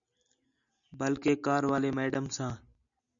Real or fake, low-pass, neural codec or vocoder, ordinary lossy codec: real; 7.2 kHz; none; MP3, 96 kbps